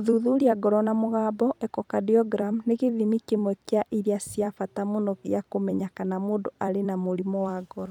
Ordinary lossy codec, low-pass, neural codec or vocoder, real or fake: none; 19.8 kHz; vocoder, 44.1 kHz, 128 mel bands every 256 samples, BigVGAN v2; fake